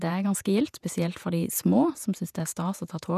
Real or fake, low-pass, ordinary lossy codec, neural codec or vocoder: fake; 14.4 kHz; none; vocoder, 48 kHz, 128 mel bands, Vocos